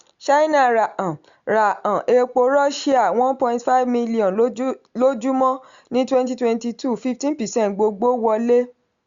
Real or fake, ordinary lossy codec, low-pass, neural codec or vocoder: real; Opus, 64 kbps; 7.2 kHz; none